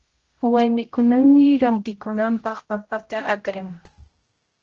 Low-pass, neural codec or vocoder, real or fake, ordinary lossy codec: 7.2 kHz; codec, 16 kHz, 0.5 kbps, X-Codec, HuBERT features, trained on general audio; fake; Opus, 16 kbps